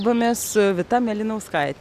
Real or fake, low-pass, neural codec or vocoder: real; 14.4 kHz; none